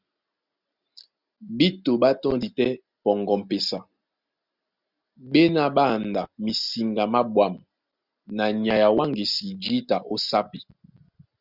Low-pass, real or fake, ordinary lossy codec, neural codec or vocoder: 5.4 kHz; real; Opus, 64 kbps; none